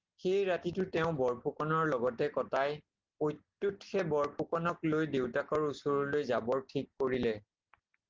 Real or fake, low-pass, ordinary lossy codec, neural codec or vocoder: real; 7.2 kHz; Opus, 16 kbps; none